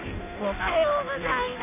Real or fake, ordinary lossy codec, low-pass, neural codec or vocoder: fake; none; 3.6 kHz; codec, 16 kHz in and 24 kHz out, 0.6 kbps, FireRedTTS-2 codec